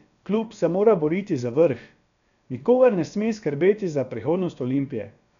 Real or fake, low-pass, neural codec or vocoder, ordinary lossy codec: fake; 7.2 kHz; codec, 16 kHz, about 1 kbps, DyCAST, with the encoder's durations; none